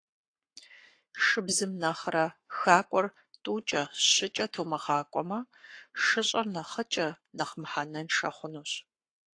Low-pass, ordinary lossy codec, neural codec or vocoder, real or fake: 9.9 kHz; AAC, 48 kbps; codec, 44.1 kHz, 7.8 kbps, Pupu-Codec; fake